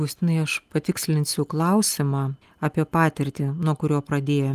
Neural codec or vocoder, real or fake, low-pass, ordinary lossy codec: none; real; 14.4 kHz; Opus, 24 kbps